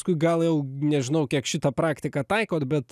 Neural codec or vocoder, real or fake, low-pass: none; real; 14.4 kHz